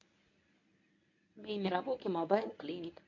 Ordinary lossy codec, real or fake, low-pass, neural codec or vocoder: MP3, 48 kbps; fake; 7.2 kHz; codec, 24 kHz, 0.9 kbps, WavTokenizer, medium speech release version 1